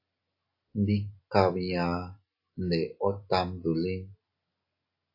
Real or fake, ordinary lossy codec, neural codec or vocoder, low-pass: real; AAC, 32 kbps; none; 5.4 kHz